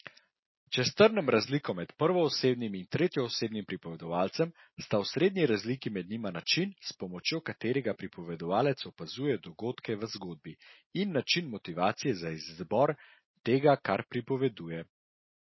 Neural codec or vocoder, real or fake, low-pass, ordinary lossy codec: none; real; 7.2 kHz; MP3, 24 kbps